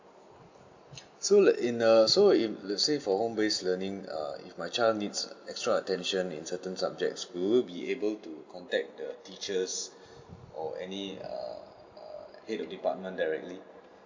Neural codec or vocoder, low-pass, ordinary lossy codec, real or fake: none; 7.2 kHz; MP3, 64 kbps; real